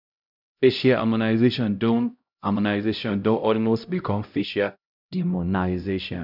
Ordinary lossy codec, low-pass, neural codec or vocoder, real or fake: none; 5.4 kHz; codec, 16 kHz, 0.5 kbps, X-Codec, HuBERT features, trained on LibriSpeech; fake